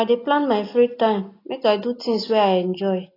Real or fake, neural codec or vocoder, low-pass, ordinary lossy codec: real; none; 5.4 kHz; AAC, 24 kbps